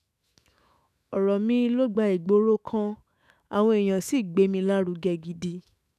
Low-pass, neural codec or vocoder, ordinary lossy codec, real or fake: 14.4 kHz; autoencoder, 48 kHz, 128 numbers a frame, DAC-VAE, trained on Japanese speech; none; fake